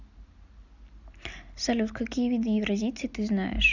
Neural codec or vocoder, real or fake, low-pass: none; real; 7.2 kHz